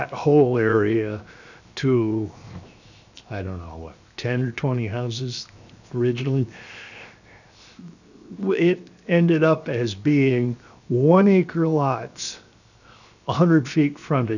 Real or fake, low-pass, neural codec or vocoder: fake; 7.2 kHz; codec, 16 kHz, 0.7 kbps, FocalCodec